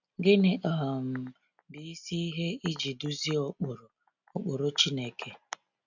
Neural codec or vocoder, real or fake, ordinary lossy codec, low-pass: none; real; none; 7.2 kHz